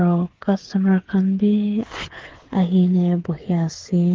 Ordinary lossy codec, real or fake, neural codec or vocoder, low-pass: Opus, 16 kbps; fake; codec, 16 kHz, 8 kbps, FreqCodec, smaller model; 7.2 kHz